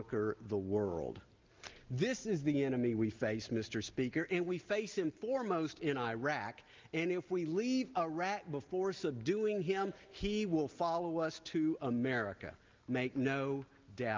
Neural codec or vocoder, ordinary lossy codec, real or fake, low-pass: none; Opus, 24 kbps; real; 7.2 kHz